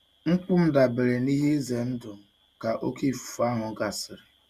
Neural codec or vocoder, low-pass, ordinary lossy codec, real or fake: none; 14.4 kHz; Opus, 64 kbps; real